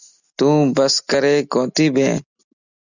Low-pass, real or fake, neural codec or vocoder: 7.2 kHz; real; none